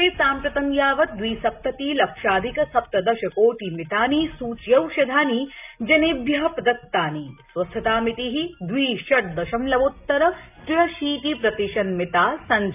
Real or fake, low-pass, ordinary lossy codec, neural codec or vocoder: real; 3.6 kHz; none; none